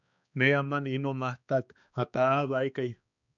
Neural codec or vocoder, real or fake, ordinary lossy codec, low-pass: codec, 16 kHz, 2 kbps, X-Codec, HuBERT features, trained on balanced general audio; fake; MP3, 96 kbps; 7.2 kHz